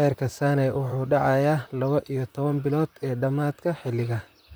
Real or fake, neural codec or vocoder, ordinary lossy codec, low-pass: fake; vocoder, 44.1 kHz, 128 mel bands, Pupu-Vocoder; none; none